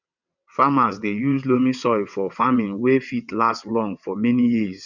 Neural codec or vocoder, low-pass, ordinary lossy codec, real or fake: vocoder, 22.05 kHz, 80 mel bands, WaveNeXt; 7.2 kHz; none; fake